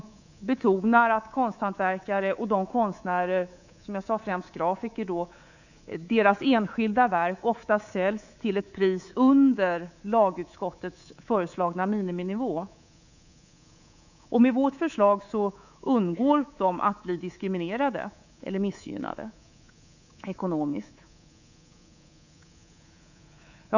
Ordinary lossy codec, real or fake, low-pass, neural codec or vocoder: none; fake; 7.2 kHz; codec, 24 kHz, 3.1 kbps, DualCodec